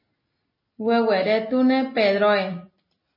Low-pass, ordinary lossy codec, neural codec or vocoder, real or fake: 5.4 kHz; MP3, 24 kbps; none; real